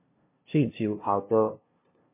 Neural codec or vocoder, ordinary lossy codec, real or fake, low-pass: codec, 16 kHz, 0.5 kbps, FunCodec, trained on LibriTTS, 25 frames a second; MP3, 24 kbps; fake; 3.6 kHz